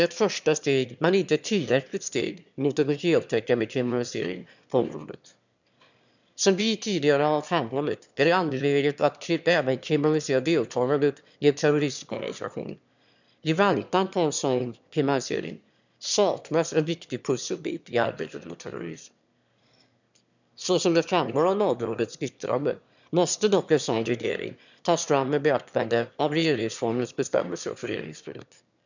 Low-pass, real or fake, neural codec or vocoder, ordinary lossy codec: 7.2 kHz; fake; autoencoder, 22.05 kHz, a latent of 192 numbers a frame, VITS, trained on one speaker; none